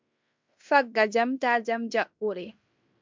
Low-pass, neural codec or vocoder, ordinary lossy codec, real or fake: 7.2 kHz; codec, 16 kHz in and 24 kHz out, 0.9 kbps, LongCat-Audio-Codec, fine tuned four codebook decoder; MP3, 64 kbps; fake